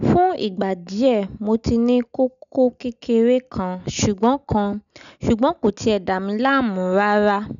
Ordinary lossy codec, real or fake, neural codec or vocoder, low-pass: none; real; none; 7.2 kHz